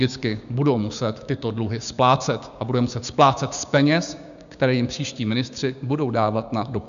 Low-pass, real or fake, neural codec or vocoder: 7.2 kHz; fake; codec, 16 kHz, 6 kbps, DAC